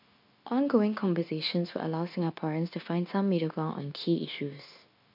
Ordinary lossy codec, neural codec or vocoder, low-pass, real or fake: MP3, 48 kbps; codec, 16 kHz, 0.9 kbps, LongCat-Audio-Codec; 5.4 kHz; fake